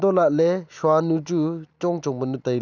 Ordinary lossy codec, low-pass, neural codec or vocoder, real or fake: none; 7.2 kHz; none; real